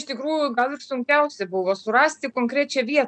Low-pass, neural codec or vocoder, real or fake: 9.9 kHz; none; real